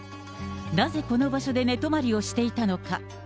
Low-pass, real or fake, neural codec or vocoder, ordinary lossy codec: none; real; none; none